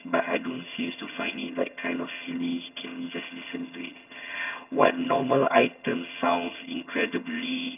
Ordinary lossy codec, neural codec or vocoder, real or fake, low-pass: none; vocoder, 22.05 kHz, 80 mel bands, HiFi-GAN; fake; 3.6 kHz